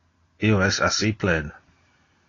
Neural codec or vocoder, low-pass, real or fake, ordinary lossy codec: none; 7.2 kHz; real; AAC, 32 kbps